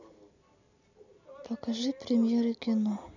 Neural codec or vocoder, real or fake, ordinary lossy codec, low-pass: none; real; none; 7.2 kHz